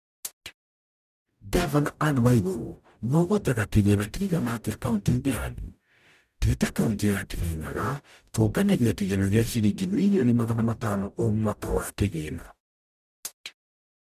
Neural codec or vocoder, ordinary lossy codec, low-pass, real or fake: codec, 44.1 kHz, 0.9 kbps, DAC; none; 14.4 kHz; fake